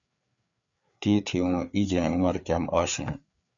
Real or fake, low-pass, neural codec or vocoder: fake; 7.2 kHz; codec, 16 kHz, 4 kbps, FreqCodec, larger model